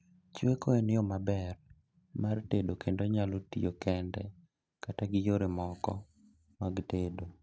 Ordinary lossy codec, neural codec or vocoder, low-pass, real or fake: none; none; none; real